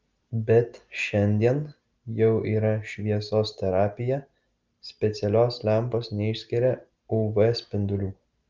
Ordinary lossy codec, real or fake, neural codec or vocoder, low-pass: Opus, 32 kbps; real; none; 7.2 kHz